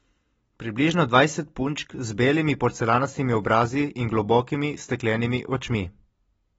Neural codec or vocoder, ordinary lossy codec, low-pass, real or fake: none; AAC, 24 kbps; 19.8 kHz; real